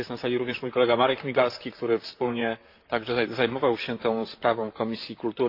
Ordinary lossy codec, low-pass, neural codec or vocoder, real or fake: AAC, 32 kbps; 5.4 kHz; vocoder, 44.1 kHz, 128 mel bands, Pupu-Vocoder; fake